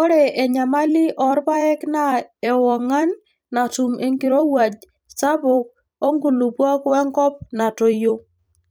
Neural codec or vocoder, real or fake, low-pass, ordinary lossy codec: vocoder, 44.1 kHz, 128 mel bands every 256 samples, BigVGAN v2; fake; none; none